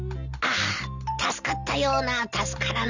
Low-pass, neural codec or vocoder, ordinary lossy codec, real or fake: 7.2 kHz; none; none; real